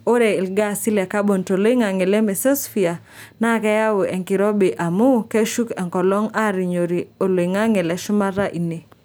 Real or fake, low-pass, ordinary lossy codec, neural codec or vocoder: real; none; none; none